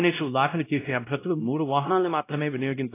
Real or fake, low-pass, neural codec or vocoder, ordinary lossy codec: fake; 3.6 kHz; codec, 16 kHz, 0.5 kbps, X-Codec, WavLM features, trained on Multilingual LibriSpeech; AAC, 24 kbps